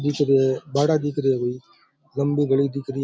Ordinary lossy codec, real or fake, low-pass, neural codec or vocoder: none; real; none; none